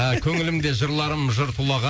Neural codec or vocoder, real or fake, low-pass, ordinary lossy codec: none; real; none; none